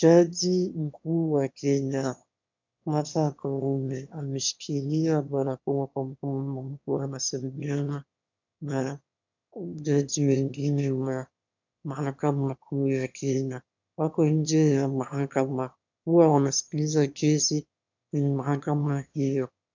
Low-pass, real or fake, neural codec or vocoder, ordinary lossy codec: 7.2 kHz; fake; autoencoder, 22.05 kHz, a latent of 192 numbers a frame, VITS, trained on one speaker; MP3, 64 kbps